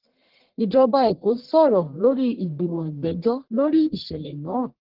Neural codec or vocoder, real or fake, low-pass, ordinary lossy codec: codec, 44.1 kHz, 1.7 kbps, Pupu-Codec; fake; 5.4 kHz; Opus, 16 kbps